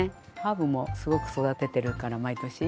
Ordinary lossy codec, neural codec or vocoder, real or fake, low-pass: none; none; real; none